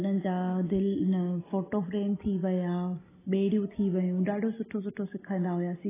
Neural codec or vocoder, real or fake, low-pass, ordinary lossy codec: none; real; 3.6 kHz; AAC, 16 kbps